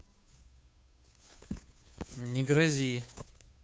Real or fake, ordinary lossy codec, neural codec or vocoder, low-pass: fake; none; codec, 16 kHz, 2 kbps, FunCodec, trained on Chinese and English, 25 frames a second; none